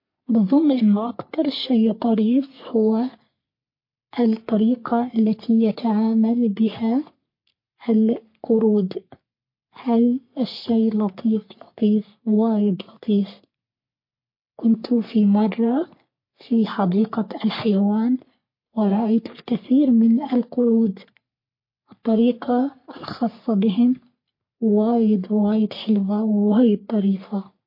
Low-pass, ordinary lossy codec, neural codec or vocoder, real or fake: 5.4 kHz; MP3, 32 kbps; codec, 44.1 kHz, 3.4 kbps, Pupu-Codec; fake